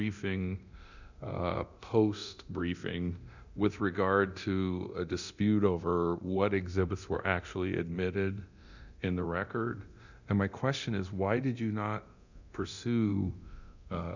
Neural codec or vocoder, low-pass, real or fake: codec, 24 kHz, 0.9 kbps, DualCodec; 7.2 kHz; fake